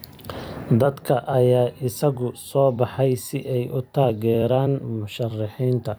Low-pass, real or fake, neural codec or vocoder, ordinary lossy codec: none; fake; vocoder, 44.1 kHz, 128 mel bands every 256 samples, BigVGAN v2; none